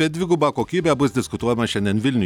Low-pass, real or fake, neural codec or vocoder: 19.8 kHz; real; none